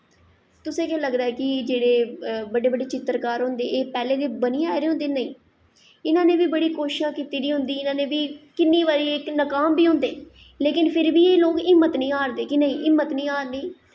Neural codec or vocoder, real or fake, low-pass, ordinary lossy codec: none; real; none; none